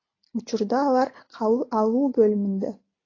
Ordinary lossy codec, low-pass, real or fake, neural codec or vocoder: AAC, 32 kbps; 7.2 kHz; real; none